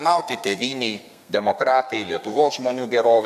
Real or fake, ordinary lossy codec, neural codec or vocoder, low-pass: fake; MP3, 96 kbps; codec, 32 kHz, 1.9 kbps, SNAC; 14.4 kHz